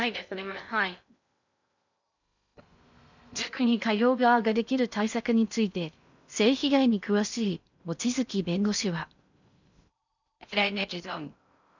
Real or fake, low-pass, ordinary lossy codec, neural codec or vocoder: fake; 7.2 kHz; none; codec, 16 kHz in and 24 kHz out, 0.6 kbps, FocalCodec, streaming, 2048 codes